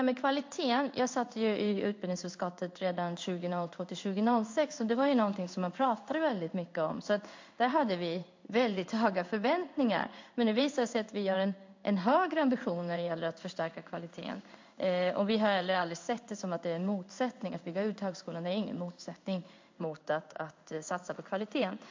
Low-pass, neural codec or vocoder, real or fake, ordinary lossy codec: 7.2 kHz; codec, 16 kHz in and 24 kHz out, 1 kbps, XY-Tokenizer; fake; MP3, 48 kbps